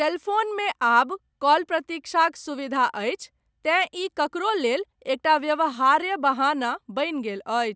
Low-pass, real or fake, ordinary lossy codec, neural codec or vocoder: none; real; none; none